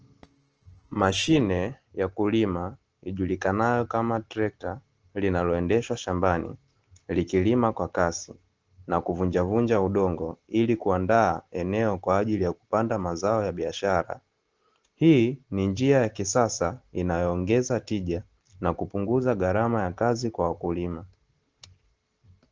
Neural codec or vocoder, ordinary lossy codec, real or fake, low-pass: none; Opus, 16 kbps; real; 7.2 kHz